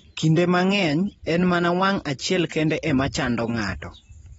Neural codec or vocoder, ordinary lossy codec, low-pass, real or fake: none; AAC, 24 kbps; 10.8 kHz; real